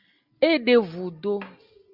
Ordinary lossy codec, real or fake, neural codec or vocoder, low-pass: Opus, 64 kbps; real; none; 5.4 kHz